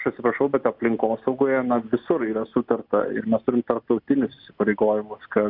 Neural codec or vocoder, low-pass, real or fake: none; 5.4 kHz; real